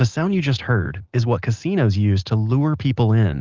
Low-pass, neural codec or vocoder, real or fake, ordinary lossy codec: 7.2 kHz; none; real; Opus, 32 kbps